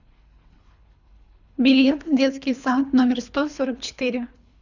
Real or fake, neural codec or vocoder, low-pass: fake; codec, 24 kHz, 3 kbps, HILCodec; 7.2 kHz